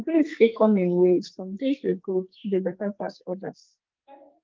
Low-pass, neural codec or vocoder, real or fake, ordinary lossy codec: 7.2 kHz; codec, 44.1 kHz, 2.6 kbps, SNAC; fake; Opus, 32 kbps